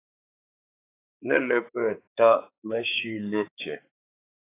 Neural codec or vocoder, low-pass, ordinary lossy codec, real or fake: codec, 16 kHz, 4 kbps, X-Codec, HuBERT features, trained on balanced general audio; 3.6 kHz; AAC, 24 kbps; fake